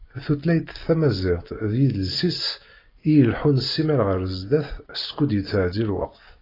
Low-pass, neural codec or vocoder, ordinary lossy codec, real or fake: 5.4 kHz; none; AAC, 24 kbps; real